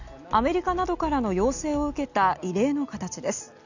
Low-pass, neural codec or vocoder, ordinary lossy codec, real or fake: 7.2 kHz; none; none; real